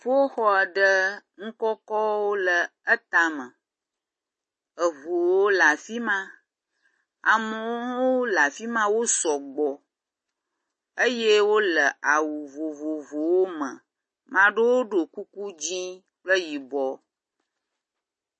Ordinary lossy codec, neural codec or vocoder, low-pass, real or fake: MP3, 32 kbps; none; 10.8 kHz; real